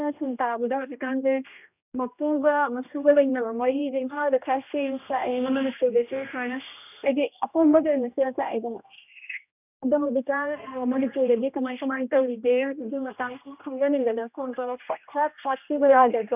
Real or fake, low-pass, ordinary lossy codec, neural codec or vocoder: fake; 3.6 kHz; none; codec, 16 kHz, 1 kbps, X-Codec, HuBERT features, trained on general audio